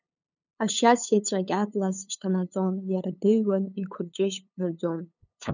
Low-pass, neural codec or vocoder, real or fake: 7.2 kHz; codec, 16 kHz, 8 kbps, FunCodec, trained on LibriTTS, 25 frames a second; fake